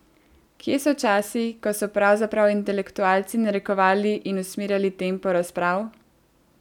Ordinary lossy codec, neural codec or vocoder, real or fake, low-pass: none; none; real; 19.8 kHz